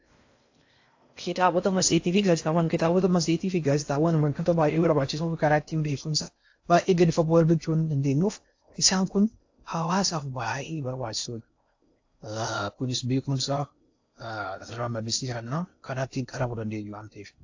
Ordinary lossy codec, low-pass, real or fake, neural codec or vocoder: AAC, 48 kbps; 7.2 kHz; fake; codec, 16 kHz in and 24 kHz out, 0.6 kbps, FocalCodec, streaming, 4096 codes